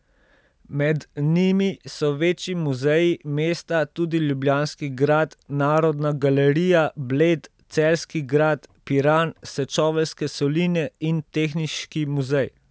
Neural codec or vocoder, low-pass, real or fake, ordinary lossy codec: none; none; real; none